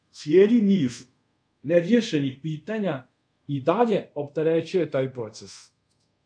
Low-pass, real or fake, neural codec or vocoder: 9.9 kHz; fake; codec, 24 kHz, 0.5 kbps, DualCodec